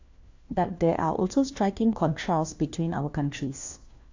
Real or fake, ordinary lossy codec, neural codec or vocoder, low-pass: fake; AAC, 48 kbps; codec, 16 kHz, 1 kbps, FunCodec, trained on LibriTTS, 50 frames a second; 7.2 kHz